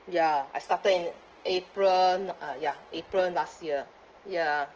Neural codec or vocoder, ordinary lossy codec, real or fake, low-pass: none; Opus, 16 kbps; real; 7.2 kHz